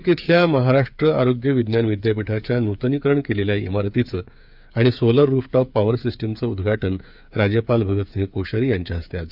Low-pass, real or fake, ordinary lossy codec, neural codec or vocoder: 5.4 kHz; fake; none; codec, 16 kHz, 8 kbps, FreqCodec, smaller model